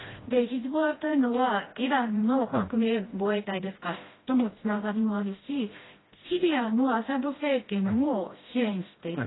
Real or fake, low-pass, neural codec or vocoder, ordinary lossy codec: fake; 7.2 kHz; codec, 16 kHz, 1 kbps, FreqCodec, smaller model; AAC, 16 kbps